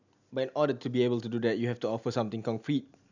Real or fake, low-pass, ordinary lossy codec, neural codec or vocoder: real; 7.2 kHz; none; none